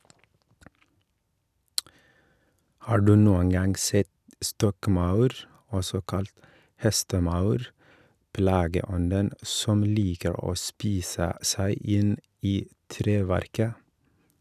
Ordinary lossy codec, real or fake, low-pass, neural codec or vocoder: none; real; 14.4 kHz; none